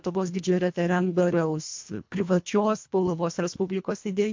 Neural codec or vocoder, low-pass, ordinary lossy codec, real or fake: codec, 24 kHz, 1.5 kbps, HILCodec; 7.2 kHz; MP3, 48 kbps; fake